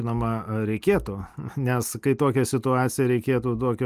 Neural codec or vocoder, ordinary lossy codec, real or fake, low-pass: none; Opus, 32 kbps; real; 14.4 kHz